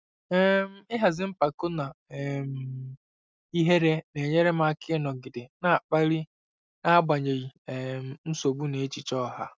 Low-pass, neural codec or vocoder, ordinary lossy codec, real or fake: none; none; none; real